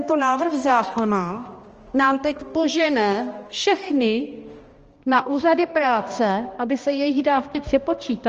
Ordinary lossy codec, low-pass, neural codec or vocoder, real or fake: Opus, 24 kbps; 7.2 kHz; codec, 16 kHz, 1 kbps, X-Codec, HuBERT features, trained on balanced general audio; fake